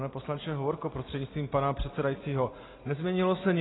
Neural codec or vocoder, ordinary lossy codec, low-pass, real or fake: none; AAC, 16 kbps; 7.2 kHz; real